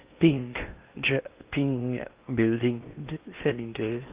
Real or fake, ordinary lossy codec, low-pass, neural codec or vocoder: fake; Opus, 24 kbps; 3.6 kHz; codec, 16 kHz in and 24 kHz out, 0.8 kbps, FocalCodec, streaming, 65536 codes